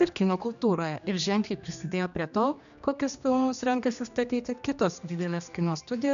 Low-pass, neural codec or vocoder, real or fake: 7.2 kHz; codec, 16 kHz, 2 kbps, X-Codec, HuBERT features, trained on general audio; fake